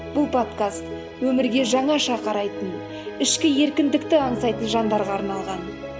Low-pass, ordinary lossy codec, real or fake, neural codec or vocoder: none; none; real; none